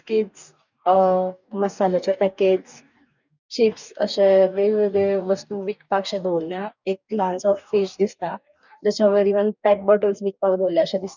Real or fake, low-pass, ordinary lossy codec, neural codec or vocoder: fake; 7.2 kHz; none; codec, 44.1 kHz, 2.6 kbps, DAC